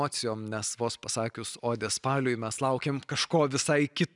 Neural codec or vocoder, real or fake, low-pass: none; real; 10.8 kHz